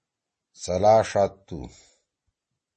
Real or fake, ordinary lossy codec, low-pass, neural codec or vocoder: real; MP3, 32 kbps; 10.8 kHz; none